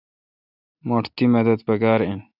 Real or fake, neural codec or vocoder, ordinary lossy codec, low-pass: real; none; AAC, 48 kbps; 5.4 kHz